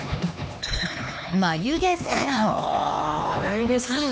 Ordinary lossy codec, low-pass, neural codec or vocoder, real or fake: none; none; codec, 16 kHz, 2 kbps, X-Codec, HuBERT features, trained on LibriSpeech; fake